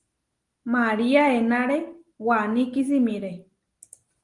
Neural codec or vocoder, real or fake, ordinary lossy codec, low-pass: none; real; Opus, 24 kbps; 10.8 kHz